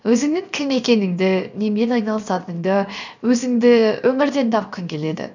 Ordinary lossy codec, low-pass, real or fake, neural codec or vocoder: none; 7.2 kHz; fake; codec, 16 kHz, 0.7 kbps, FocalCodec